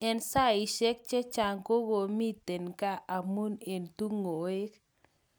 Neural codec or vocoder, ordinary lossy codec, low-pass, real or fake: none; none; none; real